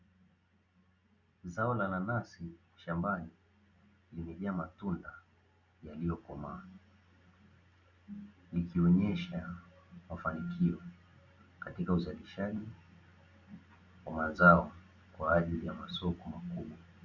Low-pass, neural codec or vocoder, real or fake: 7.2 kHz; none; real